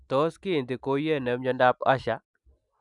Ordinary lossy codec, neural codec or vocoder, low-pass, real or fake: none; none; 10.8 kHz; real